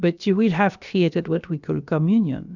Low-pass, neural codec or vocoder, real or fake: 7.2 kHz; codec, 16 kHz, about 1 kbps, DyCAST, with the encoder's durations; fake